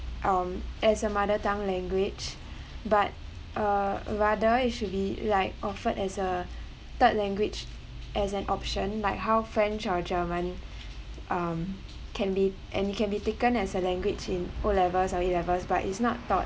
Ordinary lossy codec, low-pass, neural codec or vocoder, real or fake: none; none; none; real